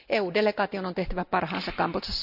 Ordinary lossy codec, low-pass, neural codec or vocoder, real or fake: none; 5.4 kHz; none; real